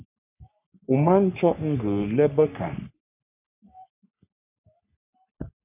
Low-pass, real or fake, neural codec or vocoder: 3.6 kHz; fake; codec, 44.1 kHz, 3.4 kbps, Pupu-Codec